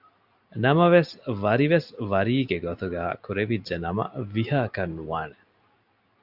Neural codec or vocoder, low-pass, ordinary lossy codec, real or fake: none; 5.4 kHz; AAC, 48 kbps; real